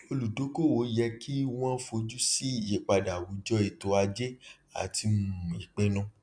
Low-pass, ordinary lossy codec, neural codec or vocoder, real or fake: 9.9 kHz; MP3, 96 kbps; none; real